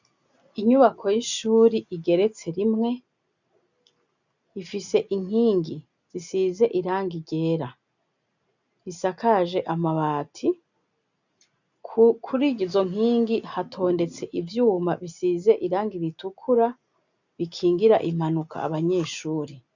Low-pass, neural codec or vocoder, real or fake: 7.2 kHz; none; real